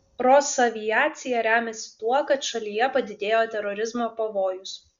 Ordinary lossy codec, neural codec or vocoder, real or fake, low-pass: Opus, 64 kbps; none; real; 7.2 kHz